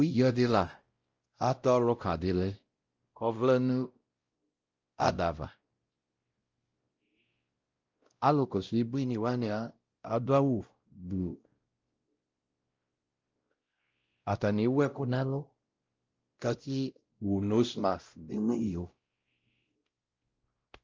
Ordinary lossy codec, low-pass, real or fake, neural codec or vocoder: Opus, 24 kbps; 7.2 kHz; fake; codec, 16 kHz, 0.5 kbps, X-Codec, WavLM features, trained on Multilingual LibriSpeech